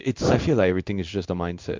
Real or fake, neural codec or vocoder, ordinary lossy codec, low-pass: fake; codec, 16 kHz in and 24 kHz out, 1 kbps, XY-Tokenizer; MP3, 64 kbps; 7.2 kHz